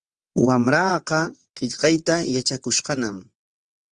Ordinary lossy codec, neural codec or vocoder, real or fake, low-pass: Opus, 32 kbps; vocoder, 22.05 kHz, 80 mel bands, WaveNeXt; fake; 9.9 kHz